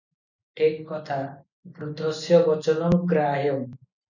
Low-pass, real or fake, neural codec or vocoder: 7.2 kHz; real; none